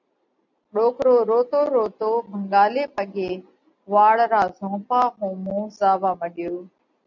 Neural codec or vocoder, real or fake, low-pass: none; real; 7.2 kHz